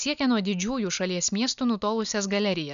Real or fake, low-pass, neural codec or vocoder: real; 7.2 kHz; none